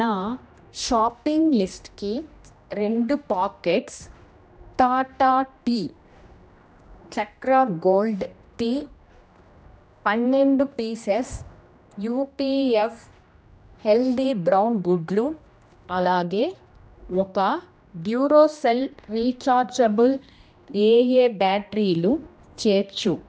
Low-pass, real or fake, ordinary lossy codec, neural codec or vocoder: none; fake; none; codec, 16 kHz, 1 kbps, X-Codec, HuBERT features, trained on general audio